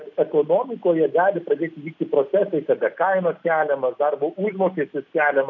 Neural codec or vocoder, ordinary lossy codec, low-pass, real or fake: none; MP3, 48 kbps; 7.2 kHz; real